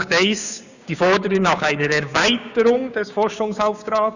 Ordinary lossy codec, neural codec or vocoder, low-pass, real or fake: none; codec, 16 kHz, 6 kbps, DAC; 7.2 kHz; fake